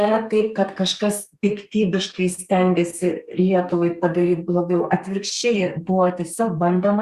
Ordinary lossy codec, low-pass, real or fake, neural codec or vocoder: Opus, 64 kbps; 14.4 kHz; fake; codec, 32 kHz, 1.9 kbps, SNAC